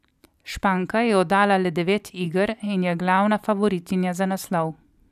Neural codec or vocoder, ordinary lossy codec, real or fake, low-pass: vocoder, 44.1 kHz, 128 mel bands every 512 samples, BigVGAN v2; none; fake; 14.4 kHz